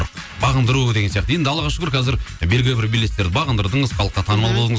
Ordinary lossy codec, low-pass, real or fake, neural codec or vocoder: none; none; real; none